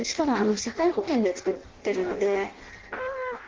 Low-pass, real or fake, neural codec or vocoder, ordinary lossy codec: 7.2 kHz; fake; codec, 16 kHz in and 24 kHz out, 0.6 kbps, FireRedTTS-2 codec; Opus, 16 kbps